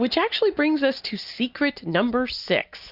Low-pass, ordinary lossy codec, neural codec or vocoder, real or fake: 5.4 kHz; Opus, 64 kbps; none; real